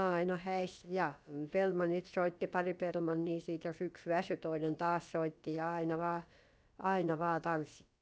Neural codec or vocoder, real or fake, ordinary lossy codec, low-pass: codec, 16 kHz, about 1 kbps, DyCAST, with the encoder's durations; fake; none; none